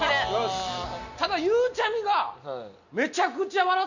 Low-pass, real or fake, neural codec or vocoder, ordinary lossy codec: 7.2 kHz; real; none; none